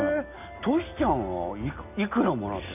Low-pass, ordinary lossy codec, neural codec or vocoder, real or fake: 3.6 kHz; none; none; real